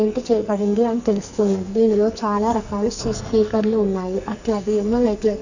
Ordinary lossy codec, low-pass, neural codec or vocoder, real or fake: none; 7.2 kHz; codec, 44.1 kHz, 2.6 kbps, SNAC; fake